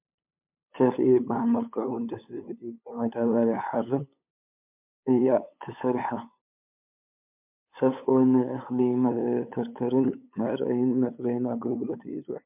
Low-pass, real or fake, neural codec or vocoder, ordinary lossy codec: 3.6 kHz; fake; codec, 16 kHz, 8 kbps, FunCodec, trained on LibriTTS, 25 frames a second; MP3, 32 kbps